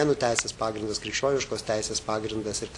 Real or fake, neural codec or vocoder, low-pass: real; none; 10.8 kHz